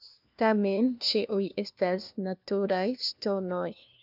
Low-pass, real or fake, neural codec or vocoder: 5.4 kHz; fake; codec, 16 kHz, 1 kbps, FunCodec, trained on LibriTTS, 50 frames a second